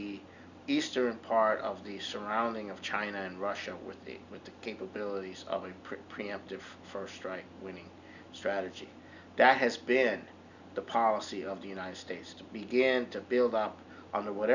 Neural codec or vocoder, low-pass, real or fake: none; 7.2 kHz; real